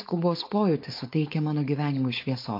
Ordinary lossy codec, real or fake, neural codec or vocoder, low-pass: MP3, 32 kbps; fake; codec, 16 kHz, 4.8 kbps, FACodec; 5.4 kHz